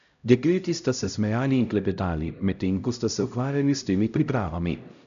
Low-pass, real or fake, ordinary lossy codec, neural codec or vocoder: 7.2 kHz; fake; none; codec, 16 kHz, 0.5 kbps, X-Codec, HuBERT features, trained on LibriSpeech